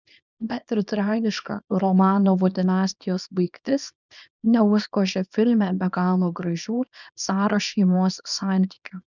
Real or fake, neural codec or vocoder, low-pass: fake; codec, 24 kHz, 0.9 kbps, WavTokenizer, small release; 7.2 kHz